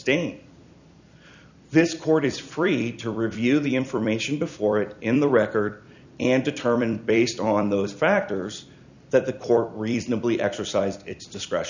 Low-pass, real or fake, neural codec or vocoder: 7.2 kHz; fake; vocoder, 44.1 kHz, 128 mel bands every 256 samples, BigVGAN v2